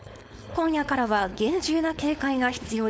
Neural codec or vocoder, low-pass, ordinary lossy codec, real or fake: codec, 16 kHz, 4.8 kbps, FACodec; none; none; fake